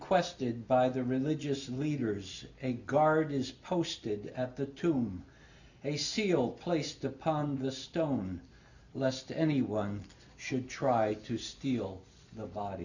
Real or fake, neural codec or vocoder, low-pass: real; none; 7.2 kHz